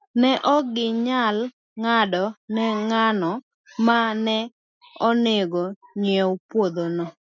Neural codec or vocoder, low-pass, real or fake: none; 7.2 kHz; real